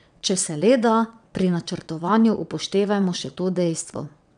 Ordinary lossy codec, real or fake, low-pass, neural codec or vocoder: none; fake; 9.9 kHz; vocoder, 22.05 kHz, 80 mel bands, WaveNeXt